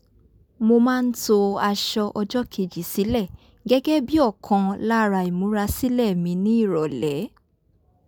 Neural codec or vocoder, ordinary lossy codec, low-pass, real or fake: none; none; none; real